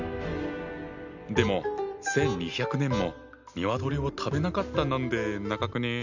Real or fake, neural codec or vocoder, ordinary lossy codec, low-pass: real; none; none; 7.2 kHz